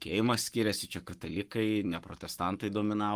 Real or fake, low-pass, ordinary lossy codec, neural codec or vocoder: fake; 14.4 kHz; Opus, 24 kbps; codec, 44.1 kHz, 7.8 kbps, Pupu-Codec